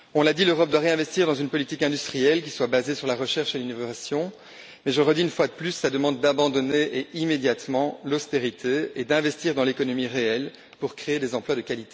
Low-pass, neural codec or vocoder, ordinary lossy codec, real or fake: none; none; none; real